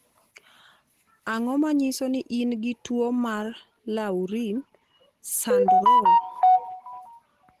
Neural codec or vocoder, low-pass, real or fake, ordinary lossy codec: none; 14.4 kHz; real; Opus, 16 kbps